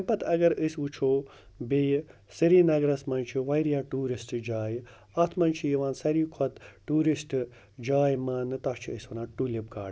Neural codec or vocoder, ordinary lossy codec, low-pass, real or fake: none; none; none; real